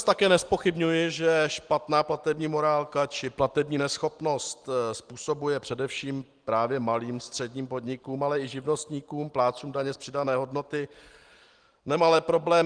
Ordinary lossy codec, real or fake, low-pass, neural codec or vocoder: Opus, 24 kbps; real; 9.9 kHz; none